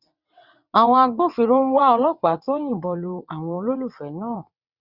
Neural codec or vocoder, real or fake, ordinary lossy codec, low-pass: vocoder, 22.05 kHz, 80 mel bands, WaveNeXt; fake; Opus, 64 kbps; 5.4 kHz